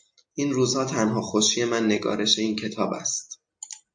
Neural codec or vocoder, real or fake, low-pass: none; real; 9.9 kHz